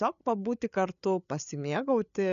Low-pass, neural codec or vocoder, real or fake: 7.2 kHz; codec, 16 kHz, 8 kbps, FreqCodec, larger model; fake